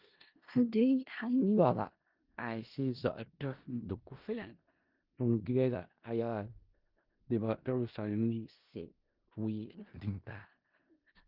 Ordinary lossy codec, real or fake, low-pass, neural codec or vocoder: Opus, 32 kbps; fake; 5.4 kHz; codec, 16 kHz in and 24 kHz out, 0.4 kbps, LongCat-Audio-Codec, four codebook decoder